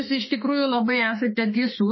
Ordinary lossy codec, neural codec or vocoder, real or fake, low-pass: MP3, 24 kbps; autoencoder, 48 kHz, 32 numbers a frame, DAC-VAE, trained on Japanese speech; fake; 7.2 kHz